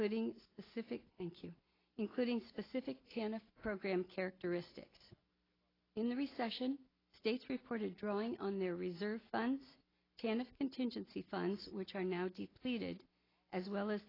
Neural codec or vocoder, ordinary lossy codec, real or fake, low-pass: none; AAC, 24 kbps; real; 5.4 kHz